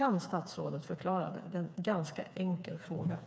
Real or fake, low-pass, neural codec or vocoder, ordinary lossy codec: fake; none; codec, 16 kHz, 4 kbps, FreqCodec, smaller model; none